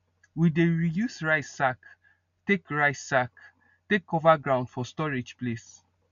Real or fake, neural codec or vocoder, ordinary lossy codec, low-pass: real; none; MP3, 96 kbps; 7.2 kHz